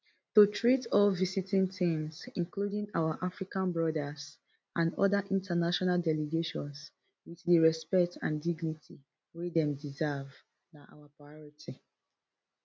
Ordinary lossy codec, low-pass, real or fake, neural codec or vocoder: none; none; real; none